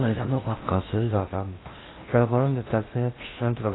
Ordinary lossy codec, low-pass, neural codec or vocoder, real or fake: AAC, 16 kbps; 7.2 kHz; codec, 16 kHz in and 24 kHz out, 0.6 kbps, FocalCodec, streaming, 4096 codes; fake